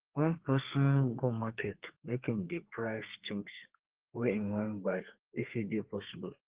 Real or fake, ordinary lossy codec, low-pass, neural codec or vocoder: fake; Opus, 16 kbps; 3.6 kHz; codec, 32 kHz, 1.9 kbps, SNAC